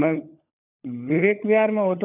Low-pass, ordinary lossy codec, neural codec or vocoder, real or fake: 3.6 kHz; none; codec, 16 kHz, 16 kbps, FunCodec, trained on LibriTTS, 50 frames a second; fake